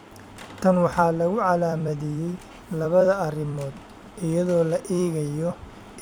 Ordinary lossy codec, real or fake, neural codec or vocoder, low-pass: none; fake; vocoder, 44.1 kHz, 128 mel bands every 256 samples, BigVGAN v2; none